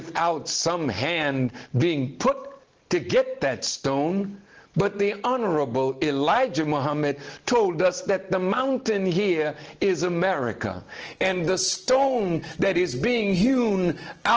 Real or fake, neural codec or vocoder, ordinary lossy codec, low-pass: real; none; Opus, 16 kbps; 7.2 kHz